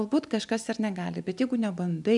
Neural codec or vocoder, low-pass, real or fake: none; 10.8 kHz; real